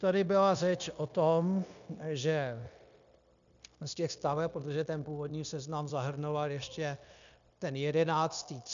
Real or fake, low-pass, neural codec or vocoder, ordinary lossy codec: fake; 7.2 kHz; codec, 16 kHz, 0.9 kbps, LongCat-Audio-Codec; MP3, 96 kbps